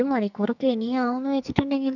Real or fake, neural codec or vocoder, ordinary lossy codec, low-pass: fake; codec, 44.1 kHz, 2.6 kbps, SNAC; none; 7.2 kHz